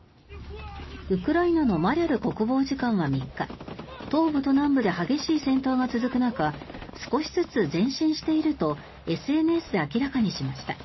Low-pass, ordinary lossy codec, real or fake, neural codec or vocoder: 7.2 kHz; MP3, 24 kbps; real; none